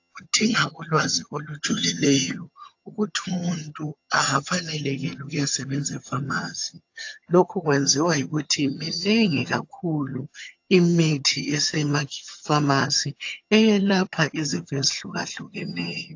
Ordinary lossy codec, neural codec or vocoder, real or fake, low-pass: AAC, 48 kbps; vocoder, 22.05 kHz, 80 mel bands, HiFi-GAN; fake; 7.2 kHz